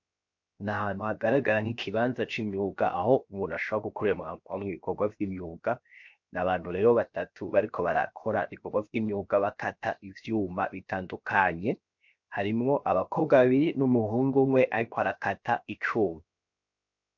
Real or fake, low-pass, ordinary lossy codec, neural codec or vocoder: fake; 7.2 kHz; MP3, 48 kbps; codec, 16 kHz, 0.7 kbps, FocalCodec